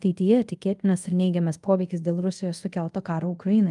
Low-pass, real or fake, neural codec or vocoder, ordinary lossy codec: 10.8 kHz; fake; codec, 24 kHz, 0.5 kbps, DualCodec; Opus, 32 kbps